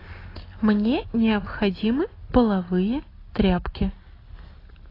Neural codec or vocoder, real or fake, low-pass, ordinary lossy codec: none; real; 5.4 kHz; AAC, 24 kbps